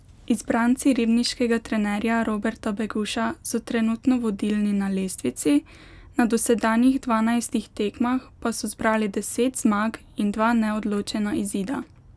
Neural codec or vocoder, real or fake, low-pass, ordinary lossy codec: none; real; none; none